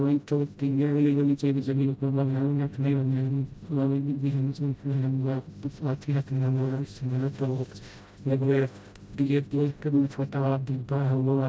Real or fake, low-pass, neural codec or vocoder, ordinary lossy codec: fake; none; codec, 16 kHz, 0.5 kbps, FreqCodec, smaller model; none